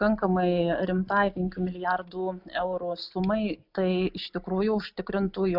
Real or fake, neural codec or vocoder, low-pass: real; none; 5.4 kHz